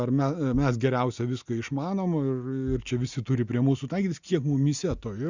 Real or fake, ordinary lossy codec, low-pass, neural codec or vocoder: real; Opus, 64 kbps; 7.2 kHz; none